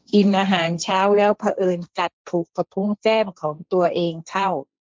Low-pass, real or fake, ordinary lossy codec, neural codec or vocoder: none; fake; none; codec, 16 kHz, 1.1 kbps, Voila-Tokenizer